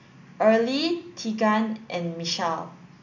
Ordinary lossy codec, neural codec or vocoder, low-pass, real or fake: none; none; 7.2 kHz; real